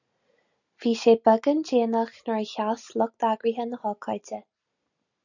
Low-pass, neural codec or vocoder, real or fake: 7.2 kHz; none; real